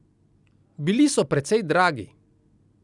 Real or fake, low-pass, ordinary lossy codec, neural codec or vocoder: real; 10.8 kHz; none; none